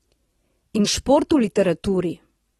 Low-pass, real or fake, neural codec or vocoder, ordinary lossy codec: 19.8 kHz; fake; vocoder, 44.1 kHz, 128 mel bands every 256 samples, BigVGAN v2; AAC, 32 kbps